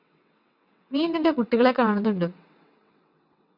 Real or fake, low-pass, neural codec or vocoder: fake; 5.4 kHz; vocoder, 22.05 kHz, 80 mel bands, WaveNeXt